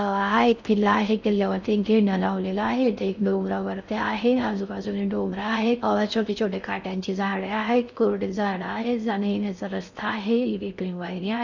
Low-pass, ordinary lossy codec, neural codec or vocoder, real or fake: 7.2 kHz; none; codec, 16 kHz in and 24 kHz out, 0.6 kbps, FocalCodec, streaming, 4096 codes; fake